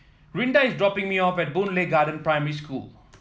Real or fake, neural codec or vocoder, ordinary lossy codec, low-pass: real; none; none; none